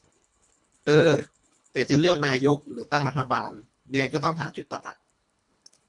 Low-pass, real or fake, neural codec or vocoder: 10.8 kHz; fake; codec, 24 kHz, 1.5 kbps, HILCodec